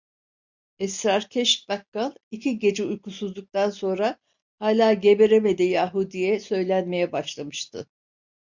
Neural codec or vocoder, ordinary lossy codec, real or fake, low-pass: none; MP3, 64 kbps; real; 7.2 kHz